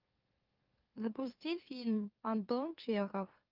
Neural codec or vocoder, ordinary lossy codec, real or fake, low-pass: autoencoder, 44.1 kHz, a latent of 192 numbers a frame, MeloTTS; Opus, 24 kbps; fake; 5.4 kHz